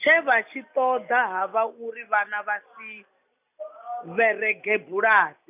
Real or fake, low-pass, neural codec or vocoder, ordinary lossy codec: real; 3.6 kHz; none; none